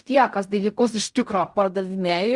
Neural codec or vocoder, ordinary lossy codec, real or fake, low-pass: codec, 16 kHz in and 24 kHz out, 0.4 kbps, LongCat-Audio-Codec, fine tuned four codebook decoder; Opus, 64 kbps; fake; 10.8 kHz